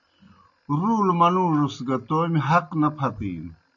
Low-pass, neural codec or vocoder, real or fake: 7.2 kHz; none; real